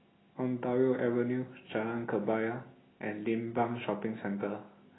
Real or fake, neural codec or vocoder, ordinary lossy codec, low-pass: real; none; AAC, 16 kbps; 7.2 kHz